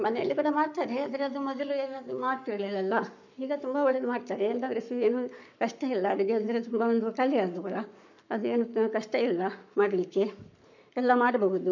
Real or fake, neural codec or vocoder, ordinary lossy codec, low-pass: fake; codec, 44.1 kHz, 7.8 kbps, Pupu-Codec; none; 7.2 kHz